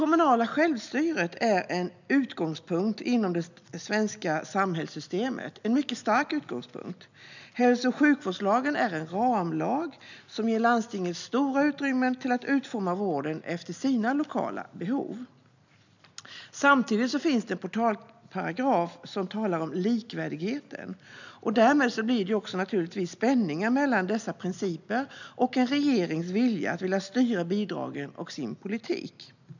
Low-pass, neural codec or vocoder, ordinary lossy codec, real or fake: 7.2 kHz; none; none; real